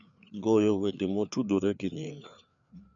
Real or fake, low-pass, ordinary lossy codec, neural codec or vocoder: fake; 7.2 kHz; none; codec, 16 kHz, 4 kbps, FreqCodec, larger model